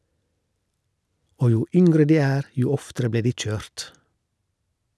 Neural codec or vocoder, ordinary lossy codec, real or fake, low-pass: none; none; real; none